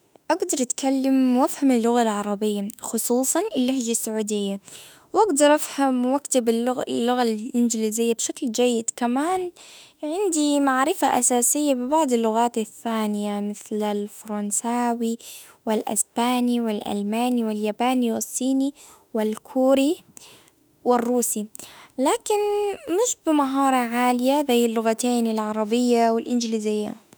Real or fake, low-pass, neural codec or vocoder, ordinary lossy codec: fake; none; autoencoder, 48 kHz, 32 numbers a frame, DAC-VAE, trained on Japanese speech; none